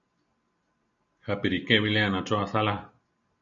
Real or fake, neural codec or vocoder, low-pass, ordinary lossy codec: real; none; 7.2 kHz; MP3, 48 kbps